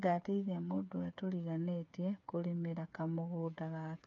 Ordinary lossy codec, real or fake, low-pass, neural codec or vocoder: none; fake; 7.2 kHz; codec, 16 kHz, 8 kbps, FreqCodec, smaller model